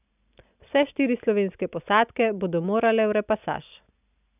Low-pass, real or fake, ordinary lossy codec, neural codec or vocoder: 3.6 kHz; real; none; none